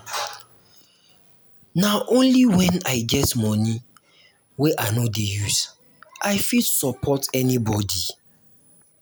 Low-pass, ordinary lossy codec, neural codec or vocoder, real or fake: none; none; none; real